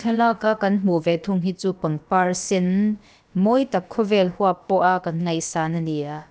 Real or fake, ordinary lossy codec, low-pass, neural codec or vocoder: fake; none; none; codec, 16 kHz, about 1 kbps, DyCAST, with the encoder's durations